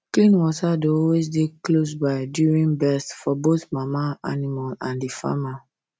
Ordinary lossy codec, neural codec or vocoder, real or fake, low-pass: none; none; real; none